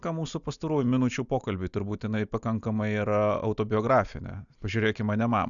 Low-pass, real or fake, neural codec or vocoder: 7.2 kHz; real; none